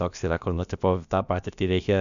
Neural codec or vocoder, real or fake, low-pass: codec, 16 kHz, about 1 kbps, DyCAST, with the encoder's durations; fake; 7.2 kHz